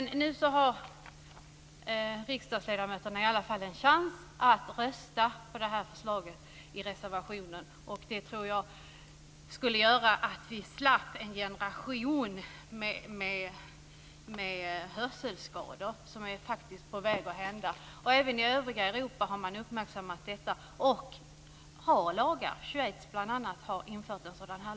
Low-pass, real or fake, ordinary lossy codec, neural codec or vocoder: none; real; none; none